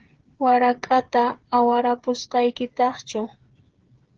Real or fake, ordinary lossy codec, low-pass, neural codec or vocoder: fake; Opus, 16 kbps; 7.2 kHz; codec, 16 kHz, 8 kbps, FreqCodec, smaller model